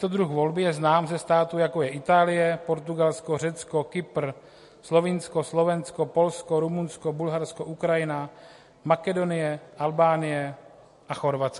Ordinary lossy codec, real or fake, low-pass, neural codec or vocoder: MP3, 48 kbps; real; 14.4 kHz; none